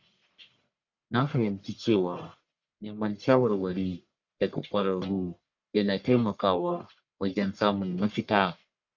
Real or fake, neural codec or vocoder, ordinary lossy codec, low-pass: fake; codec, 44.1 kHz, 1.7 kbps, Pupu-Codec; none; 7.2 kHz